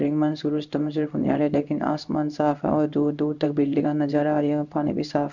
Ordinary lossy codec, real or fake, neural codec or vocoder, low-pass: none; fake; codec, 16 kHz in and 24 kHz out, 1 kbps, XY-Tokenizer; 7.2 kHz